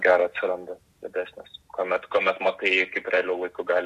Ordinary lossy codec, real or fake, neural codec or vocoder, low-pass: AAC, 64 kbps; real; none; 14.4 kHz